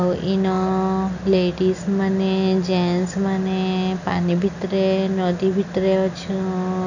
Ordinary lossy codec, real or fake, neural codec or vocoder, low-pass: none; real; none; 7.2 kHz